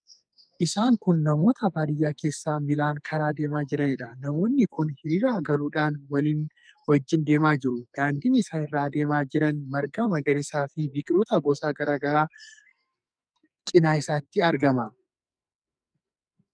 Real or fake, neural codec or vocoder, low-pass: fake; codec, 44.1 kHz, 2.6 kbps, SNAC; 9.9 kHz